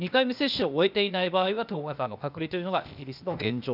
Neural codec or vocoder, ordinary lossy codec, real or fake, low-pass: codec, 16 kHz, 0.8 kbps, ZipCodec; none; fake; 5.4 kHz